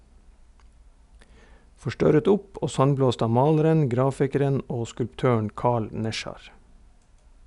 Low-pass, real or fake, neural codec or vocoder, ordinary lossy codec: 10.8 kHz; real; none; none